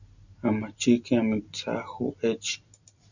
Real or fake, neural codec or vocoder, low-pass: real; none; 7.2 kHz